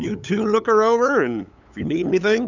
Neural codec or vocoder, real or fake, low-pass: codec, 16 kHz, 8 kbps, FunCodec, trained on LibriTTS, 25 frames a second; fake; 7.2 kHz